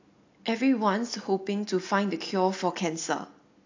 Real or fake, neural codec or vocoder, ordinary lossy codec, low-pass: real; none; AAC, 48 kbps; 7.2 kHz